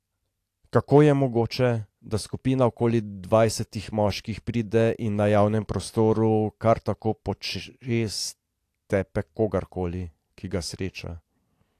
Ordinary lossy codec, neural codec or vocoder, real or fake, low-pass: AAC, 64 kbps; none; real; 14.4 kHz